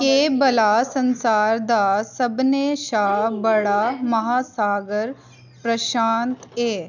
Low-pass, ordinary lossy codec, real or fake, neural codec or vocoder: 7.2 kHz; none; real; none